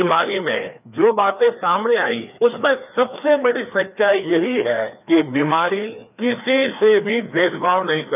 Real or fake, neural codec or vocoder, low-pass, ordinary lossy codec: fake; codec, 16 kHz, 2 kbps, FreqCodec, larger model; 3.6 kHz; none